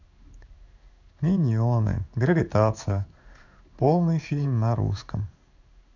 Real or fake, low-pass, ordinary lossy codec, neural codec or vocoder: fake; 7.2 kHz; none; codec, 16 kHz in and 24 kHz out, 1 kbps, XY-Tokenizer